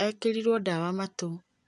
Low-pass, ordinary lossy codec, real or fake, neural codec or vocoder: 10.8 kHz; none; fake; vocoder, 24 kHz, 100 mel bands, Vocos